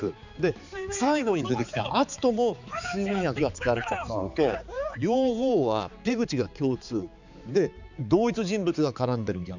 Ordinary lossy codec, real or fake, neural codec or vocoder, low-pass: none; fake; codec, 16 kHz, 4 kbps, X-Codec, HuBERT features, trained on balanced general audio; 7.2 kHz